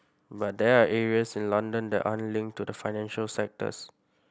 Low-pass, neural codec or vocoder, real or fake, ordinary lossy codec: none; none; real; none